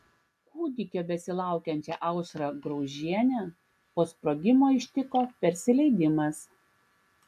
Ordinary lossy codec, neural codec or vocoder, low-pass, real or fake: AAC, 96 kbps; none; 14.4 kHz; real